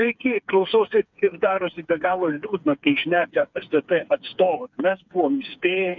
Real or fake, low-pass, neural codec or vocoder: fake; 7.2 kHz; codec, 16 kHz, 4 kbps, FreqCodec, smaller model